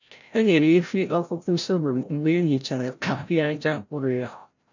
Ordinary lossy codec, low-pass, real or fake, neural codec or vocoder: none; 7.2 kHz; fake; codec, 16 kHz, 0.5 kbps, FreqCodec, larger model